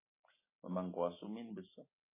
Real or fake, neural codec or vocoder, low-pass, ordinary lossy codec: real; none; 3.6 kHz; MP3, 16 kbps